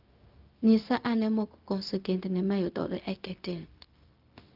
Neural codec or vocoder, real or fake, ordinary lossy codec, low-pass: codec, 16 kHz, 0.4 kbps, LongCat-Audio-Codec; fake; Opus, 24 kbps; 5.4 kHz